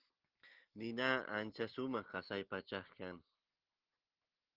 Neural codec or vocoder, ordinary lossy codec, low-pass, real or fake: none; Opus, 16 kbps; 5.4 kHz; real